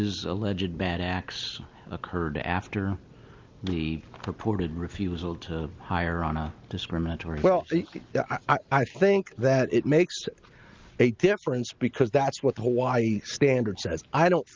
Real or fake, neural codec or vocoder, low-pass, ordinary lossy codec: real; none; 7.2 kHz; Opus, 24 kbps